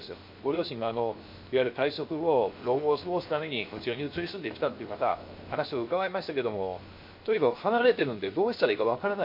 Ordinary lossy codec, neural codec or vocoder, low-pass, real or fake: MP3, 32 kbps; codec, 16 kHz, 0.7 kbps, FocalCodec; 5.4 kHz; fake